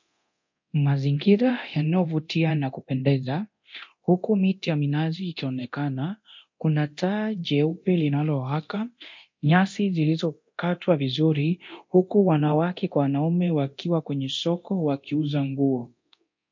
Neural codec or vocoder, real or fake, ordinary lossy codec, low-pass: codec, 24 kHz, 0.9 kbps, DualCodec; fake; MP3, 48 kbps; 7.2 kHz